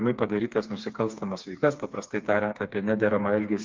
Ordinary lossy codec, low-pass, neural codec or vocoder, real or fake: Opus, 24 kbps; 7.2 kHz; codec, 16 kHz, 4 kbps, FreqCodec, smaller model; fake